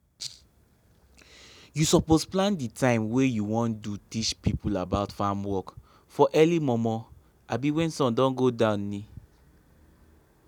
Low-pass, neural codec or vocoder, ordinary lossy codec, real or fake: 19.8 kHz; none; none; real